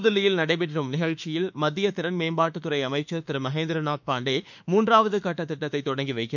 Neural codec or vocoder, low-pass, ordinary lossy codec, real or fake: autoencoder, 48 kHz, 32 numbers a frame, DAC-VAE, trained on Japanese speech; 7.2 kHz; none; fake